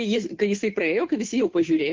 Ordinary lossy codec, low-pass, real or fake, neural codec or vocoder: Opus, 16 kbps; 7.2 kHz; fake; codec, 16 kHz, 2 kbps, FunCodec, trained on Chinese and English, 25 frames a second